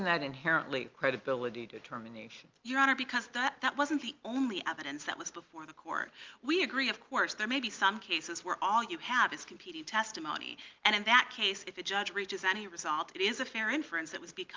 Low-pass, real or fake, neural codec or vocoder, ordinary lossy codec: 7.2 kHz; real; none; Opus, 24 kbps